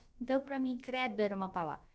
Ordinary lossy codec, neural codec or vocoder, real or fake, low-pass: none; codec, 16 kHz, about 1 kbps, DyCAST, with the encoder's durations; fake; none